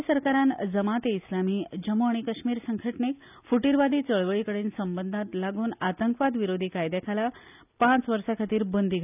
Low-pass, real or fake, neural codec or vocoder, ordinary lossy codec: 3.6 kHz; real; none; none